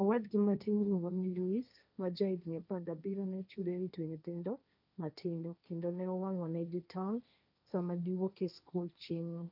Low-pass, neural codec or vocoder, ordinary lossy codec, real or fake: 5.4 kHz; codec, 16 kHz, 1.1 kbps, Voila-Tokenizer; none; fake